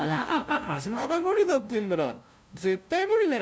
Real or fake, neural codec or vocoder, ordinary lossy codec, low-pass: fake; codec, 16 kHz, 0.5 kbps, FunCodec, trained on LibriTTS, 25 frames a second; none; none